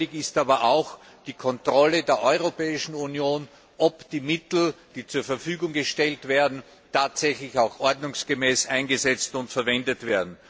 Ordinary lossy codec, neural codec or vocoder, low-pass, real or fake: none; none; none; real